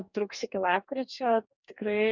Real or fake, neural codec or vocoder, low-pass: fake; codec, 44.1 kHz, 2.6 kbps, DAC; 7.2 kHz